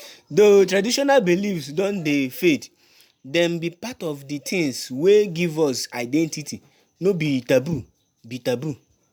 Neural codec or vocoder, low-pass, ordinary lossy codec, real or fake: none; none; none; real